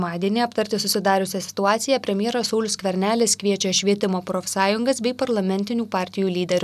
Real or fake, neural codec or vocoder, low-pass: real; none; 14.4 kHz